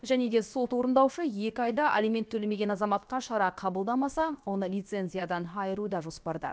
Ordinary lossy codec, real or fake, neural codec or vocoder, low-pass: none; fake; codec, 16 kHz, about 1 kbps, DyCAST, with the encoder's durations; none